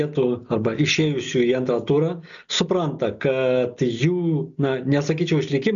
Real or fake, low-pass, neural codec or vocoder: real; 7.2 kHz; none